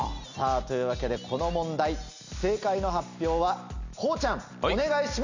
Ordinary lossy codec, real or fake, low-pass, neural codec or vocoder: Opus, 64 kbps; real; 7.2 kHz; none